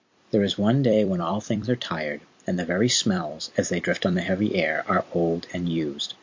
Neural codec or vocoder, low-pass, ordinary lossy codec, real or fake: vocoder, 44.1 kHz, 128 mel bands every 256 samples, BigVGAN v2; 7.2 kHz; MP3, 48 kbps; fake